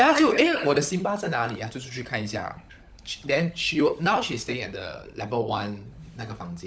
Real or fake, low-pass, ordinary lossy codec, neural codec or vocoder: fake; none; none; codec, 16 kHz, 16 kbps, FunCodec, trained on LibriTTS, 50 frames a second